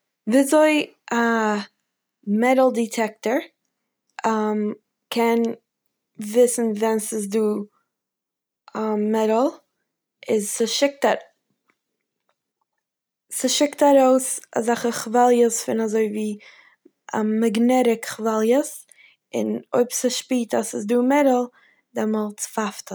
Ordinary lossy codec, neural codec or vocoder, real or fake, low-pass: none; none; real; none